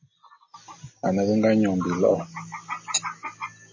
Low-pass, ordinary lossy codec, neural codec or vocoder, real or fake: 7.2 kHz; MP3, 32 kbps; none; real